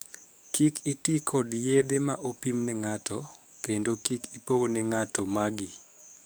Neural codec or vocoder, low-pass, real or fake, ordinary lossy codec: codec, 44.1 kHz, 7.8 kbps, DAC; none; fake; none